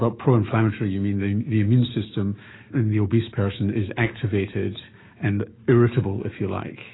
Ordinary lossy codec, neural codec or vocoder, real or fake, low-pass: AAC, 16 kbps; autoencoder, 48 kHz, 128 numbers a frame, DAC-VAE, trained on Japanese speech; fake; 7.2 kHz